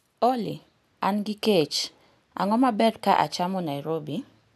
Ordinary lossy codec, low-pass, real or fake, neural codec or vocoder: none; 14.4 kHz; real; none